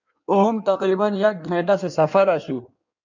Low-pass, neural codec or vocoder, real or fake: 7.2 kHz; codec, 16 kHz in and 24 kHz out, 1.1 kbps, FireRedTTS-2 codec; fake